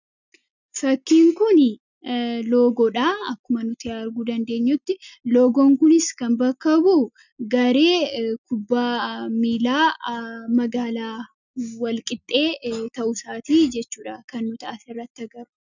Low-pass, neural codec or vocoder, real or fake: 7.2 kHz; none; real